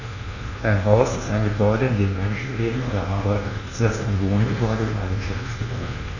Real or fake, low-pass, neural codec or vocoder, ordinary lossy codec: fake; 7.2 kHz; codec, 24 kHz, 1.2 kbps, DualCodec; none